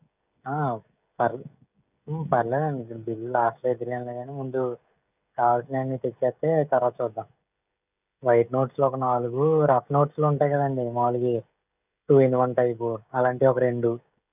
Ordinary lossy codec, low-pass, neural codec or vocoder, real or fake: none; 3.6 kHz; codec, 16 kHz, 16 kbps, FreqCodec, smaller model; fake